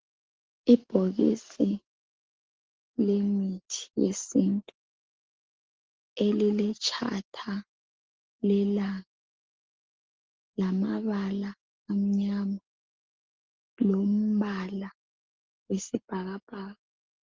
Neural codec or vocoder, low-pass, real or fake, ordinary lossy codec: none; 7.2 kHz; real; Opus, 16 kbps